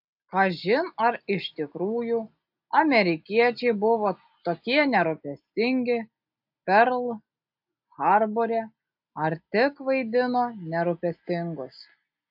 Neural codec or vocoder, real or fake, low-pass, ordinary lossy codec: none; real; 5.4 kHz; AAC, 48 kbps